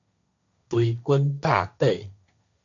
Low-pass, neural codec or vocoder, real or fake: 7.2 kHz; codec, 16 kHz, 1.1 kbps, Voila-Tokenizer; fake